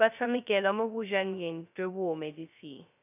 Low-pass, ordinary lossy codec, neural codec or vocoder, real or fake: 3.6 kHz; none; codec, 16 kHz, 0.2 kbps, FocalCodec; fake